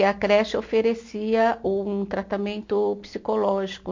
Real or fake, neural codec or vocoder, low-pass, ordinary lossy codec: real; none; 7.2 kHz; MP3, 48 kbps